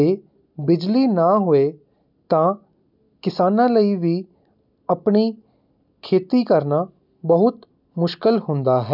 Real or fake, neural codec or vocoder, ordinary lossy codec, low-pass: real; none; none; 5.4 kHz